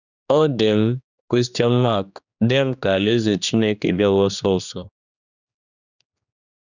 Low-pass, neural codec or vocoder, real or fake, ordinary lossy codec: 7.2 kHz; codec, 16 kHz, 2 kbps, X-Codec, HuBERT features, trained on general audio; fake; none